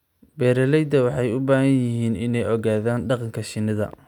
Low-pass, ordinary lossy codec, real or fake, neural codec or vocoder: 19.8 kHz; none; real; none